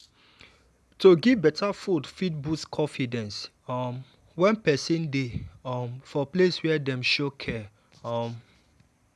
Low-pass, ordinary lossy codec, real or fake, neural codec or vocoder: none; none; real; none